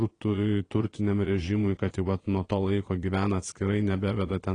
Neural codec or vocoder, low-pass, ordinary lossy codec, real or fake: vocoder, 22.05 kHz, 80 mel bands, Vocos; 9.9 kHz; AAC, 32 kbps; fake